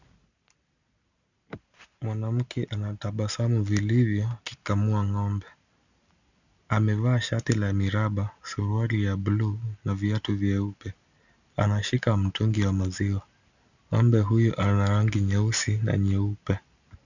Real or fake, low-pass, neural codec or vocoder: real; 7.2 kHz; none